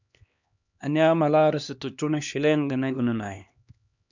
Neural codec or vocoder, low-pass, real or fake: codec, 16 kHz, 2 kbps, X-Codec, HuBERT features, trained on LibriSpeech; 7.2 kHz; fake